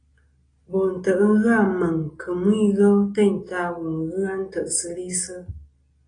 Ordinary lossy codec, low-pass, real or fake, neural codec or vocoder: AAC, 32 kbps; 9.9 kHz; real; none